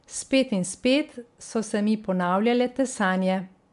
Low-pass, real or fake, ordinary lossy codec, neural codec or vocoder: 10.8 kHz; real; MP3, 64 kbps; none